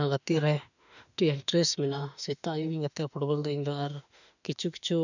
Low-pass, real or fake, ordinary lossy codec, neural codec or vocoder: 7.2 kHz; fake; none; autoencoder, 48 kHz, 32 numbers a frame, DAC-VAE, trained on Japanese speech